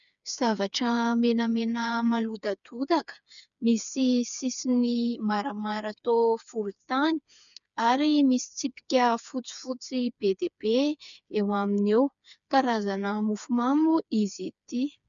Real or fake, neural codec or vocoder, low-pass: fake; codec, 16 kHz, 4 kbps, FreqCodec, smaller model; 7.2 kHz